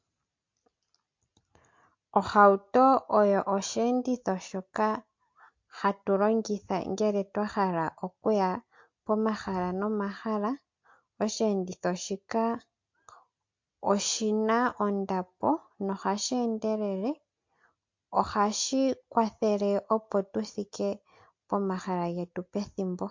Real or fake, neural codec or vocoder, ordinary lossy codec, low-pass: real; none; MP3, 48 kbps; 7.2 kHz